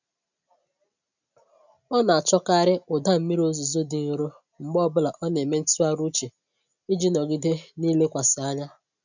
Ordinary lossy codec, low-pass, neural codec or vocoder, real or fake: none; 7.2 kHz; none; real